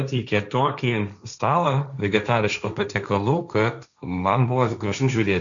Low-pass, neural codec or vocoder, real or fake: 7.2 kHz; codec, 16 kHz, 1.1 kbps, Voila-Tokenizer; fake